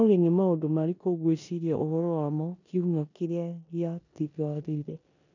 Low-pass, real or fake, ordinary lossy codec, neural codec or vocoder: 7.2 kHz; fake; none; codec, 16 kHz in and 24 kHz out, 0.9 kbps, LongCat-Audio-Codec, four codebook decoder